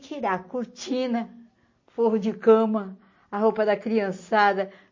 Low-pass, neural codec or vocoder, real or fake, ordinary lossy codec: 7.2 kHz; none; real; MP3, 32 kbps